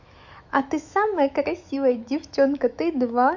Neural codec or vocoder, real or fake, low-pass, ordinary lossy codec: autoencoder, 48 kHz, 128 numbers a frame, DAC-VAE, trained on Japanese speech; fake; 7.2 kHz; none